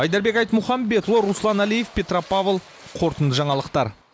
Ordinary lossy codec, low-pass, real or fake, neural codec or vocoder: none; none; real; none